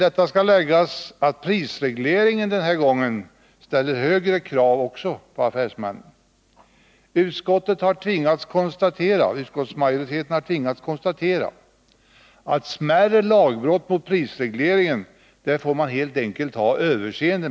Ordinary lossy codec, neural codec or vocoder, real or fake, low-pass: none; none; real; none